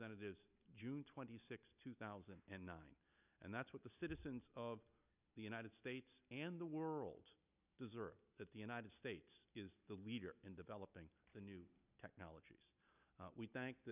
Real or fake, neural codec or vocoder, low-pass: real; none; 3.6 kHz